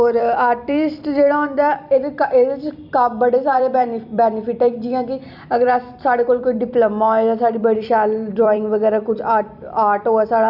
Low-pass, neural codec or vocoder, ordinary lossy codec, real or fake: 5.4 kHz; vocoder, 44.1 kHz, 128 mel bands every 256 samples, BigVGAN v2; none; fake